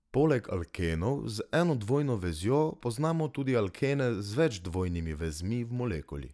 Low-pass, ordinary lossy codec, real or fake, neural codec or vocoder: none; none; real; none